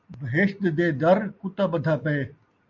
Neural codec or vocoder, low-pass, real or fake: none; 7.2 kHz; real